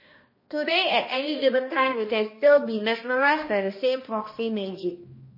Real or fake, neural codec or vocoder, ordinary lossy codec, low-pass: fake; codec, 16 kHz, 1 kbps, X-Codec, HuBERT features, trained on balanced general audio; MP3, 24 kbps; 5.4 kHz